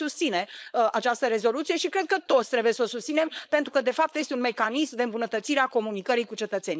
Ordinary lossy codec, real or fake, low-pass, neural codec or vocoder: none; fake; none; codec, 16 kHz, 4.8 kbps, FACodec